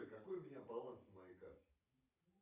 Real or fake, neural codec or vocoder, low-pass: fake; vocoder, 44.1 kHz, 128 mel bands every 512 samples, BigVGAN v2; 3.6 kHz